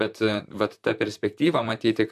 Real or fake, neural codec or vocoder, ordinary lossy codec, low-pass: fake; vocoder, 44.1 kHz, 128 mel bands, Pupu-Vocoder; MP3, 96 kbps; 14.4 kHz